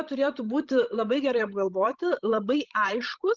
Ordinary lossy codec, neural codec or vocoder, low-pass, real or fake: Opus, 24 kbps; codec, 16 kHz, 16 kbps, FreqCodec, larger model; 7.2 kHz; fake